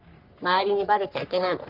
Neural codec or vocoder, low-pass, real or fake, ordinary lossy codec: codec, 44.1 kHz, 3.4 kbps, Pupu-Codec; 5.4 kHz; fake; Opus, 32 kbps